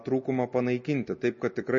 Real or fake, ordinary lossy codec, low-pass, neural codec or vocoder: real; MP3, 32 kbps; 7.2 kHz; none